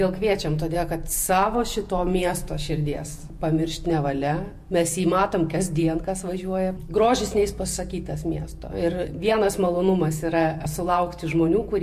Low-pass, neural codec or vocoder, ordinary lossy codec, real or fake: 14.4 kHz; vocoder, 48 kHz, 128 mel bands, Vocos; MP3, 64 kbps; fake